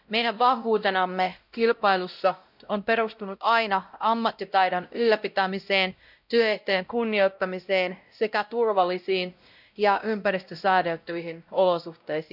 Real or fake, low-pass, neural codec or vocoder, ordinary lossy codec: fake; 5.4 kHz; codec, 16 kHz, 0.5 kbps, X-Codec, WavLM features, trained on Multilingual LibriSpeech; none